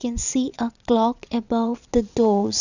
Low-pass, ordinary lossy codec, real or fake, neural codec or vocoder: 7.2 kHz; none; fake; vocoder, 22.05 kHz, 80 mel bands, WaveNeXt